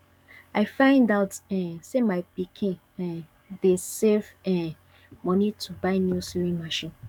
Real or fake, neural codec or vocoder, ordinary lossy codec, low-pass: fake; codec, 44.1 kHz, 7.8 kbps, DAC; none; 19.8 kHz